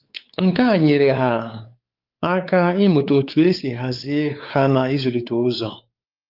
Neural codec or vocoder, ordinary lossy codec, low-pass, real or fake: codec, 16 kHz, 4 kbps, X-Codec, WavLM features, trained on Multilingual LibriSpeech; Opus, 24 kbps; 5.4 kHz; fake